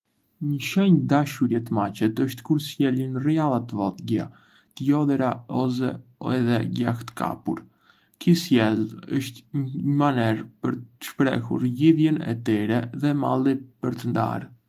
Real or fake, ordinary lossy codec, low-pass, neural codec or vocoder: real; Opus, 32 kbps; 14.4 kHz; none